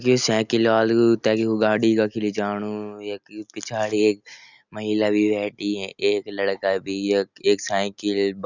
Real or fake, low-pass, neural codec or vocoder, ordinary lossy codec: real; 7.2 kHz; none; none